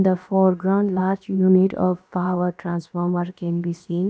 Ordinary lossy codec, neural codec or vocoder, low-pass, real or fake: none; codec, 16 kHz, about 1 kbps, DyCAST, with the encoder's durations; none; fake